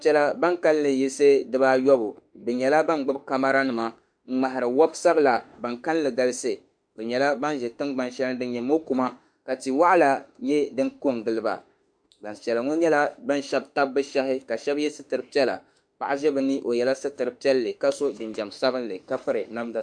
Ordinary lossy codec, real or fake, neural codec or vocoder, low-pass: MP3, 96 kbps; fake; autoencoder, 48 kHz, 32 numbers a frame, DAC-VAE, trained on Japanese speech; 9.9 kHz